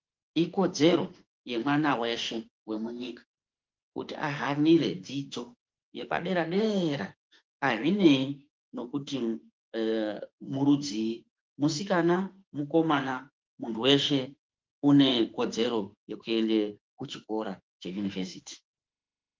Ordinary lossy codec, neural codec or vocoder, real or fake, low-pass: Opus, 32 kbps; autoencoder, 48 kHz, 32 numbers a frame, DAC-VAE, trained on Japanese speech; fake; 7.2 kHz